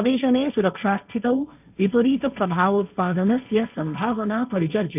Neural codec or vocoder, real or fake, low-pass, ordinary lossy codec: codec, 16 kHz, 1.1 kbps, Voila-Tokenizer; fake; 3.6 kHz; none